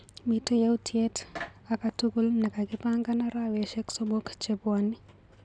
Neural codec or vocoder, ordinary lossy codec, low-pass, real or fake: none; none; 9.9 kHz; real